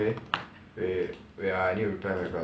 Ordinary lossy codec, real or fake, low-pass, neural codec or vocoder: none; real; none; none